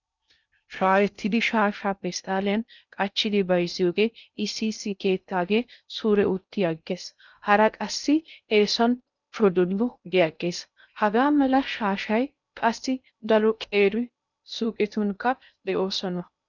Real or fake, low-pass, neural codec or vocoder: fake; 7.2 kHz; codec, 16 kHz in and 24 kHz out, 0.6 kbps, FocalCodec, streaming, 2048 codes